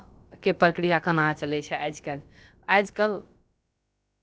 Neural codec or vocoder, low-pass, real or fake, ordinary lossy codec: codec, 16 kHz, about 1 kbps, DyCAST, with the encoder's durations; none; fake; none